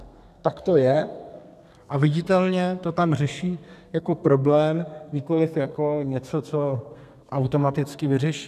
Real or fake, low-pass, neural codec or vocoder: fake; 14.4 kHz; codec, 32 kHz, 1.9 kbps, SNAC